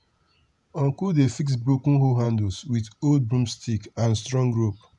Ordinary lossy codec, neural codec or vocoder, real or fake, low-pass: none; vocoder, 48 kHz, 128 mel bands, Vocos; fake; 10.8 kHz